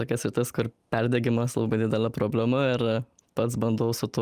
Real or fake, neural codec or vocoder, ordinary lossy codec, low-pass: real; none; Opus, 32 kbps; 14.4 kHz